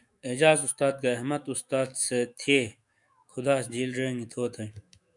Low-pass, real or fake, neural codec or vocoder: 10.8 kHz; fake; autoencoder, 48 kHz, 128 numbers a frame, DAC-VAE, trained on Japanese speech